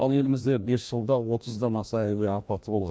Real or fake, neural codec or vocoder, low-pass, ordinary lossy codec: fake; codec, 16 kHz, 1 kbps, FreqCodec, larger model; none; none